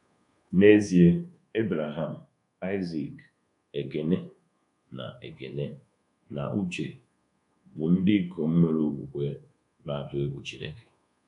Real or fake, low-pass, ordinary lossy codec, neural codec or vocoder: fake; 10.8 kHz; none; codec, 24 kHz, 1.2 kbps, DualCodec